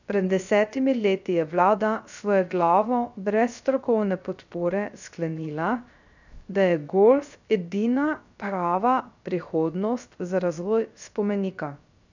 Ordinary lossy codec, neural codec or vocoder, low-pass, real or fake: none; codec, 16 kHz, 0.3 kbps, FocalCodec; 7.2 kHz; fake